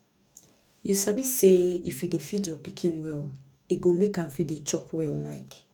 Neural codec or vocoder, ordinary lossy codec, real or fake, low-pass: codec, 44.1 kHz, 2.6 kbps, DAC; none; fake; 19.8 kHz